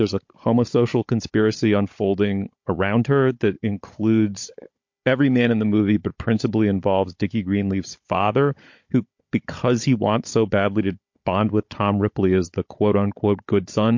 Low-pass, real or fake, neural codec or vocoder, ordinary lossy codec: 7.2 kHz; fake; codec, 16 kHz, 16 kbps, FunCodec, trained on Chinese and English, 50 frames a second; MP3, 48 kbps